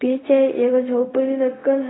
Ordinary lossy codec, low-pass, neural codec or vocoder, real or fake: AAC, 16 kbps; 7.2 kHz; codec, 16 kHz, 0.4 kbps, LongCat-Audio-Codec; fake